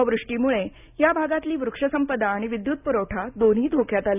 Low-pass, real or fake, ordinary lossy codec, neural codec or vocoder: 3.6 kHz; real; none; none